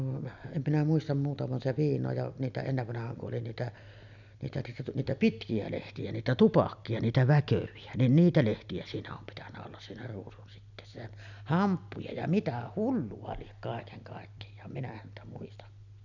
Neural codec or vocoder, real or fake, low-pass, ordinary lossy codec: none; real; 7.2 kHz; none